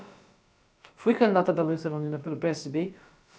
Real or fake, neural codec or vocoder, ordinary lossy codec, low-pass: fake; codec, 16 kHz, about 1 kbps, DyCAST, with the encoder's durations; none; none